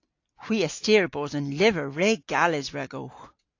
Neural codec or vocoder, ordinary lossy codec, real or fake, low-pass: none; AAC, 48 kbps; real; 7.2 kHz